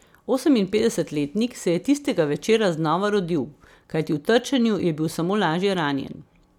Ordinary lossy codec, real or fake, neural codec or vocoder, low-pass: none; real; none; 19.8 kHz